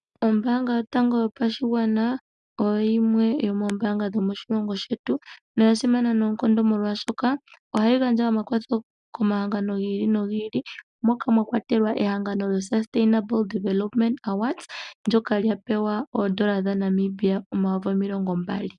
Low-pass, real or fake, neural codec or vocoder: 10.8 kHz; real; none